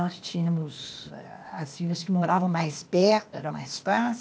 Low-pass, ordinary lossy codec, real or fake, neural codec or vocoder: none; none; fake; codec, 16 kHz, 0.8 kbps, ZipCodec